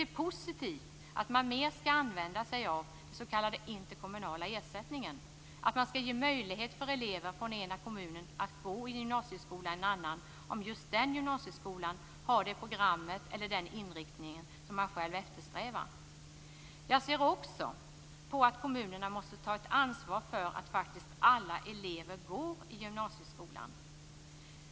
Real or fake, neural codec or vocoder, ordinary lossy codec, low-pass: real; none; none; none